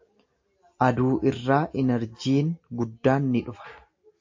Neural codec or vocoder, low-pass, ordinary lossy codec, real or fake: none; 7.2 kHz; AAC, 48 kbps; real